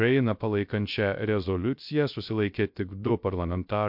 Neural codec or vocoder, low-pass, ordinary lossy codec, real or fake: codec, 16 kHz, 0.3 kbps, FocalCodec; 5.4 kHz; MP3, 48 kbps; fake